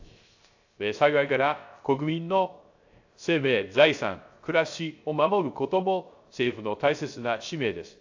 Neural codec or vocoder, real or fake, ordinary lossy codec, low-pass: codec, 16 kHz, 0.3 kbps, FocalCodec; fake; AAC, 48 kbps; 7.2 kHz